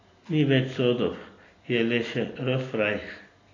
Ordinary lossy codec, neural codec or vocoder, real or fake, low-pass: AAC, 32 kbps; none; real; 7.2 kHz